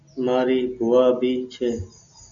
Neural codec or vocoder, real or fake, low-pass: none; real; 7.2 kHz